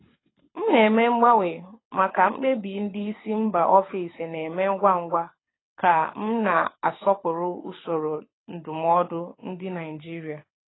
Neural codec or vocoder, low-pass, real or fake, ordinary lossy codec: codec, 24 kHz, 6 kbps, HILCodec; 7.2 kHz; fake; AAC, 16 kbps